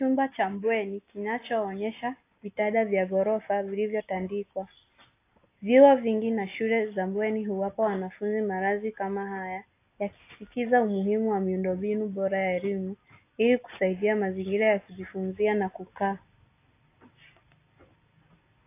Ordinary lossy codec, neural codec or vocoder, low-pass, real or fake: AAC, 24 kbps; none; 3.6 kHz; real